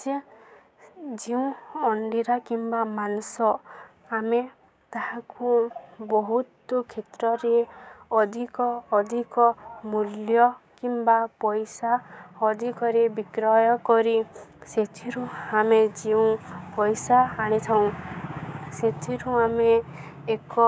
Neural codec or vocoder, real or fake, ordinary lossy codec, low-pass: codec, 16 kHz, 6 kbps, DAC; fake; none; none